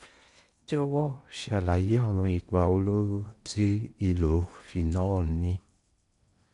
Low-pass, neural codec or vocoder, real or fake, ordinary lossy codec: 10.8 kHz; codec, 16 kHz in and 24 kHz out, 0.8 kbps, FocalCodec, streaming, 65536 codes; fake; MP3, 64 kbps